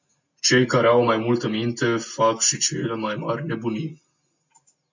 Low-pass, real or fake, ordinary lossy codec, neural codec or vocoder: 7.2 kHz; real; MP3, 64 kbps; none